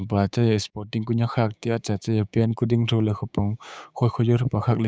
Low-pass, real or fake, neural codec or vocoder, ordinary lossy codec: none; fake; codec, 16 kHz, 6 kbps, DAC; none